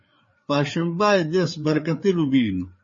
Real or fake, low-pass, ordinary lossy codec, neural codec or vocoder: fake; 7.2 kHz; MP3, 32 kbps; codec, 16 kHz, 4 kbps, FreqCodec, larger model